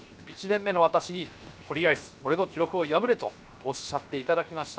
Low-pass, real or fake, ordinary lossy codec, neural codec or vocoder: none; fake; none; codec, 16 kHz, 0.7 kbps, FocalCodec